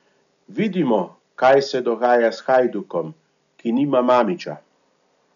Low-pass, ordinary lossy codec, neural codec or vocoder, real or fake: 7.2 kHz; none; none; real